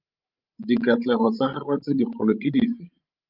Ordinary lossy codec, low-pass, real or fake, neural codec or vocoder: Opus, 24 kbps; 5.4 kHz; fake; codec, 16 kHz, 16 kbps, FreqCodec, larger model